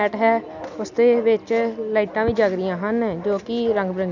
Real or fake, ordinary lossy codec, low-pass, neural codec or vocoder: real; none; 7.2 kHz; none